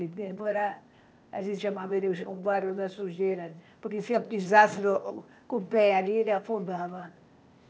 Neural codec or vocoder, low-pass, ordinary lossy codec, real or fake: codec, 16 kHz, 0.8 kbps, ZipCodec; none; none; fake